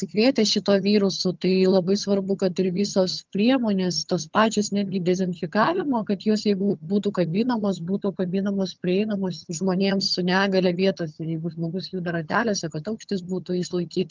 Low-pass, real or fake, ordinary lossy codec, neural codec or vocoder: 7.2 kHz; fake; Opus, 32 kbps; vocoder, 22.05 kHz, 80 mel bands, HiFi-GAN